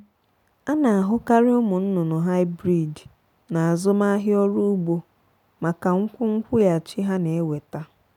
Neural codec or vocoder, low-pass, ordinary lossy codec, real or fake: none; 19.8 kHz; none; real